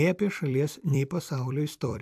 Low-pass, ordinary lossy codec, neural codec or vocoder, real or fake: 14.4 kHz; AAC, 96 kbps; vocoder, 44.1 kHz, 128 mel bands every 256 samples, BigVGAN v2; fake